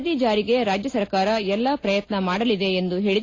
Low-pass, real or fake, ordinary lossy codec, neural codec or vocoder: 7.2 kHz; real; AAC, 32 kbps; none